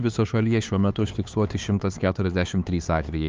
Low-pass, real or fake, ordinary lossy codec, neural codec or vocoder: 7.2 kHz; fake; Opus, 32 kbps; codec, 16 kHz, 4 kbps, X-Codec, HuBERT features, trained on LibriSpeech